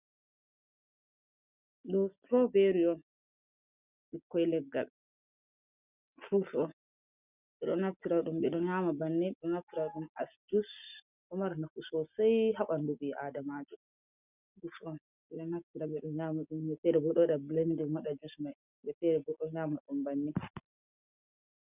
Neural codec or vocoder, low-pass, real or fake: none; 3.6 kHz; real